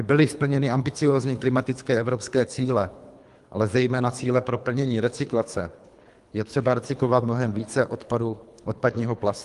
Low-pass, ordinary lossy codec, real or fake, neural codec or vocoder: 10.8 kHz; Opus, 32 kbps; fake; codec, 24 kHz, 3 kbps, HILCodec